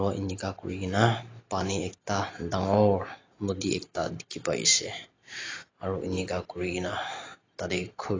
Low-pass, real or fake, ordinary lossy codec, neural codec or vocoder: 7.2 kHz; real; AAC, 32 kbps; none